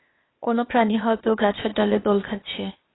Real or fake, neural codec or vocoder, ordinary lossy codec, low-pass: fake; codec, 16 kHz, 0.8 kbps, ZipCodec; AAC, 16 kbps; 7.2 kHz